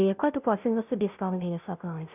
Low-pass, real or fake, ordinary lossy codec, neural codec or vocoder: 3.6 kHz; fake; none; codec, 16 kHz, 0.5 kbps, FunCodec, trained on Chinese and English, 25 frames a second